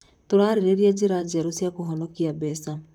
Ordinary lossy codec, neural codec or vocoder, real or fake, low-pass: none; vocoder, 44.1 kHz, 128 mel bands, Pupu-Vocoder; fake; 19.8 kHz